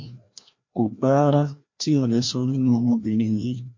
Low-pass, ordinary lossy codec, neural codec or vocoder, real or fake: 7.2 kHz; MP3, 48 kbps; codec, 16 kHz, 1 kbps, FreqCodec, larger model; fake